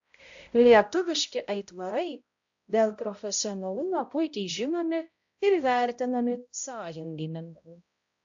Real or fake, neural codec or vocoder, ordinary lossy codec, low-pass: fake; codec, 16 kHz, 0.5 kbps, X-Codec, HuBERT features, trained on balanced general audio; AAC, 64 kbps; 7.2 kHz